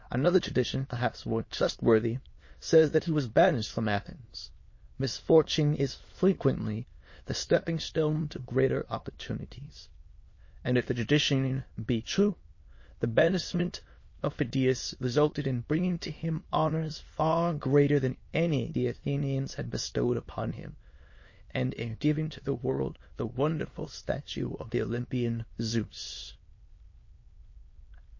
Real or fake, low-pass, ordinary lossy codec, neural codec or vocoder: fake; 7.2 kHz; MP3, 32 kbps; autoencoder, 22.05 kHz, a latent of 192 numbers a frame, VITS, trained on many speakers